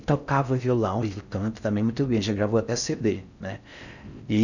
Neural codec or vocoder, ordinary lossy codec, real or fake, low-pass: codec, 16 kHz in and 24 kHz out, 0.6 kbps, FocalCodec, streaming, 4096 codes; none; fake; 7.2 kHz